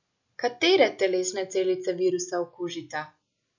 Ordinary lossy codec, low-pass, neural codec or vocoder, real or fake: none; 7.2 kHz; none; real